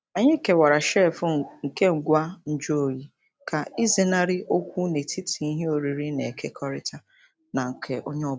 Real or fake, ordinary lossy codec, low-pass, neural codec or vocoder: real; none; none; none